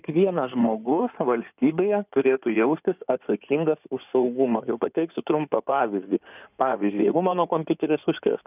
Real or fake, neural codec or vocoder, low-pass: fake; codec, 16 kHz in and 24 kHz out, 2.2 kbps, FireRedTTS-2 codec; 3.6 kHz